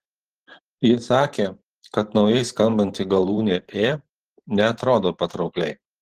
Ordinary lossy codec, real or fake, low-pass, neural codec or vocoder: Opus, 16 kbps; fake; 14.4 kHz; vocoder, 44.1 kHz, 128 mel bands every 512 samples, BigVGAN v2